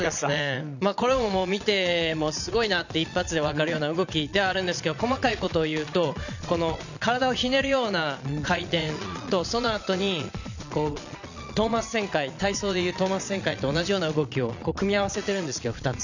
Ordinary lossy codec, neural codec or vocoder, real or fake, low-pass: none; vocoder, 22.05 kHz, 80 mel bands, Vocos; fake; 7.2 kHz